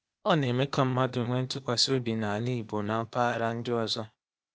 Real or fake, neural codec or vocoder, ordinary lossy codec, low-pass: fake; codec, 16 kHz, 0.8 kbps, ZipCodec; none; none